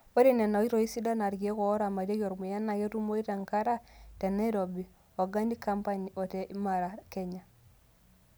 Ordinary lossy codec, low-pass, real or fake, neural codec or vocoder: none; none; real; none